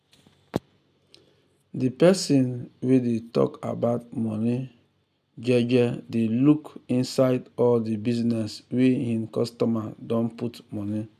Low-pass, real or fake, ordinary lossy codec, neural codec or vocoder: 14.4 kHz; real; none; none